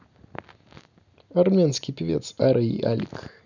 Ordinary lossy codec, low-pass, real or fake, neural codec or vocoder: none; 7.2 kHz; real; none